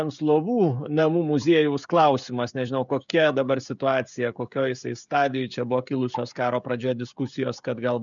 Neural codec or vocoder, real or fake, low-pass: codec, 16 kHz, 16 kbps, FreqCodec, smaller model; fake; 7.2 kHz